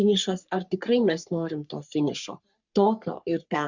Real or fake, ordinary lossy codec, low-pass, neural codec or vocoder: fake; Opus, 64 kbps; 7.2 kHz; codec, 44.1 kHz, 3.4 kbps, Pupu-Codec